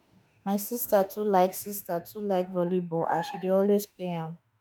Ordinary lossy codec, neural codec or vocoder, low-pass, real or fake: none; autoencoder, 48 kHz, 32 numbers a frame, DAC-VAE, trained on Japanese speech; none; fake